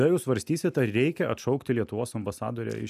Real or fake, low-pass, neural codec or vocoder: real; 14.4 kHz; none